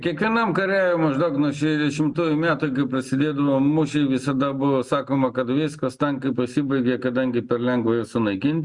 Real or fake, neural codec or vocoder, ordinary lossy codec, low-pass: real; none; Opus, 64 kbps; 10.8 kHz